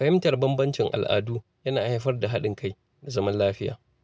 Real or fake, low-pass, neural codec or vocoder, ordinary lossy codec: real; none; none; none